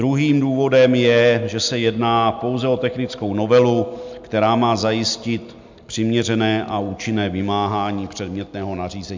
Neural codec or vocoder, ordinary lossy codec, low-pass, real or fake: none; MP3, 64 kbps; 7.2 kHz; real